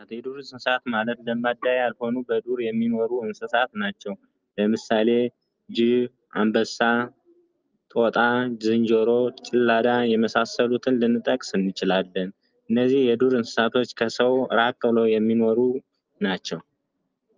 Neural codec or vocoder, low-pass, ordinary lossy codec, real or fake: none; 7.2 kHz; Opus, 24 kbps; real